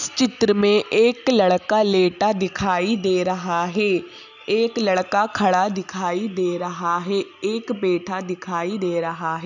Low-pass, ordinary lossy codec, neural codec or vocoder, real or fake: 7.2 kHz; none; none; real